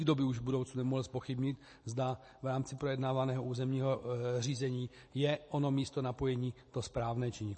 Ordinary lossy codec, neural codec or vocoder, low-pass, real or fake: MP3, 32 kbps; none; 10.8 kHz; real